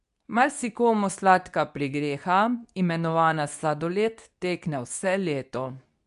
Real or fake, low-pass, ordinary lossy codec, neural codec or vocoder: fake; 10.8 kHz; none; codec, 24 kHz, 0.9 kbps, WavTokenizer, medium speech release version 2